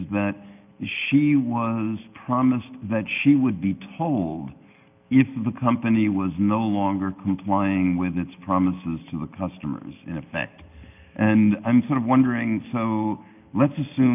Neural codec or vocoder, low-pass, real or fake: none; 3.6 kHz; real